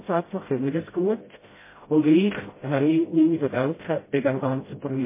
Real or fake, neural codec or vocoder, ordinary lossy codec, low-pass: fake; codec, 16 kHz, 0.5 kbps, FreqCodec, smaller model; MP3, 16 kbps; 3.6 kHz